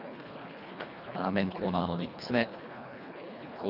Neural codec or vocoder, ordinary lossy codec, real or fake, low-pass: codec, 24 kHz, 1.5 kbps, HILCodec; none; fake; 5.4 kHz